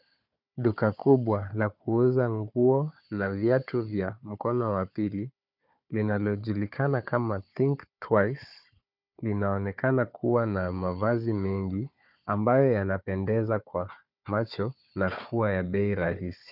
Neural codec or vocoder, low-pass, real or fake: codec, 16 kHz, 4 kbps, FunCodec, trained on Chinese and English, 50 frames a second; 5.4 kHz; fake